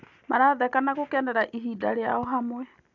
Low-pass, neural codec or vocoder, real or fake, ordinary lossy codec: 7.2 kHz; none; real; AAC, 48 kbps